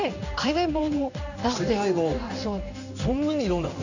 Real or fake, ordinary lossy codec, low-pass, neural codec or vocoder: fake; none; 7.2 kHz; codec, 16 kHz in and 24 kHz out, 1 kbps, XY-Tokenizer